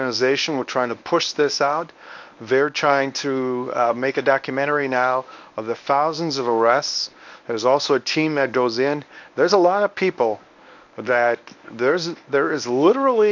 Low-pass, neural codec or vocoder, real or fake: 7.2 kHz; codec, 24 kHz, 0.9 kbps, WavTokenizer, medium speech release version 1; fake